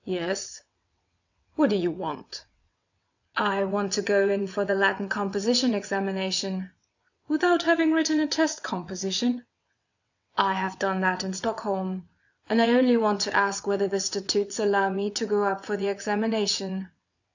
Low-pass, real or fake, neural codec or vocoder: 7.2 kHz; fake; vocoder, 22.05 kHz, 80 mel bands, WaveNeXt